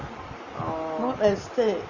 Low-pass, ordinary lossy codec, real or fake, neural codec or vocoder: 7.2 kHz; none; real; none